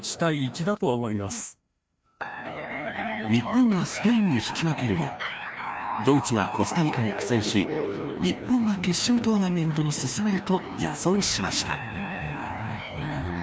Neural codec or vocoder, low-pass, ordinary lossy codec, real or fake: codec, 16 kHz, 1 kbps, FreqCodec, larger model; none; none; fake